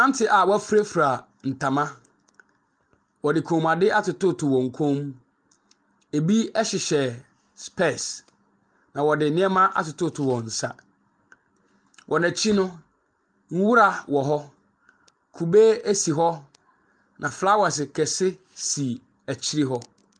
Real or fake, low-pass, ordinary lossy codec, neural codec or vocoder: real; 9.9 kHz; Opus, 32 kbps; none